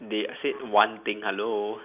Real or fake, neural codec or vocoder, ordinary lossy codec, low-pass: real; none; none; 3.6 kHz